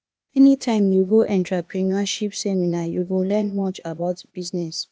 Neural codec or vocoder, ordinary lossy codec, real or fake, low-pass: codec, 16 kHz, 0.8 kbps, ZipCodec; none; fake; none